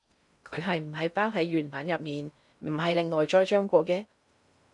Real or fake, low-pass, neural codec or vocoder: fake; 10.8 kHz; codec, 16 kHz in and 24 kHz out, 0.6 kbps, FocalCodec, streaming, 4096 codes